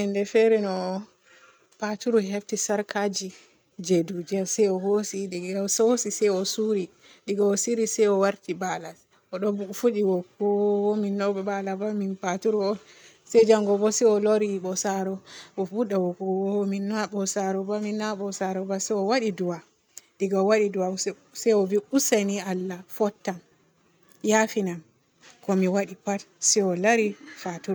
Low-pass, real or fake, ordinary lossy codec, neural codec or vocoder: none; real; none; none